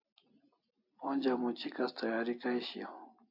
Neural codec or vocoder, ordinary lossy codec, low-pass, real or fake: none; AAC, 48 kbps; 5.4 kHz; real